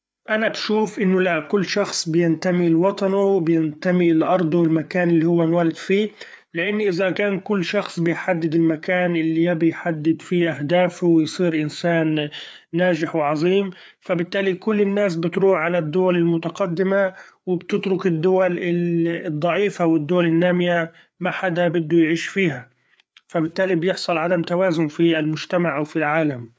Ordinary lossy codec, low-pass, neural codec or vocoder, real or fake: none; none; codec, 16 kHz, 4 kbps, FreqCodec, larger model; fake